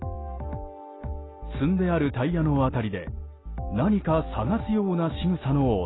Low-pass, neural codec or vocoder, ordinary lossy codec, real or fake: 7.2 kHz; none; AAC, 16 kbps; real